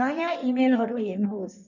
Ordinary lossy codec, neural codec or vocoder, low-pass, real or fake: none; codec, 16 kHz in and 24 kHz out, 1.1 kbps, FireRedTTS-2 codec; 7.2 kHz; fake